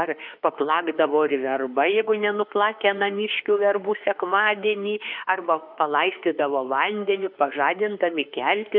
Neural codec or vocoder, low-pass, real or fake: autoencoder, 48 kHz, 32 numbers a frame, DAC-VAE, trained on Japanese speech; 5.4 kHz; fake